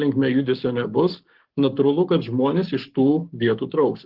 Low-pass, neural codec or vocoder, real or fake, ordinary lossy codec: 5.4 kHz; codec, 44.1 kHz, 7.8 kbps, Pupu-Codec; fake; Opus, 16 kbps